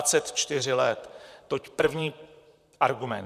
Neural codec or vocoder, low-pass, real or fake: vocoder, 44.1 kHz, 128 mel bands, Pupu-Vocoder; 14.4 kHz; fake